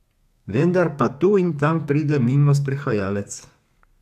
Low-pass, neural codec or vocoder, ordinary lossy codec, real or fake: 14.4 kHz; codec, 32 kHz, 1.9 kbps, SNAC; none; fake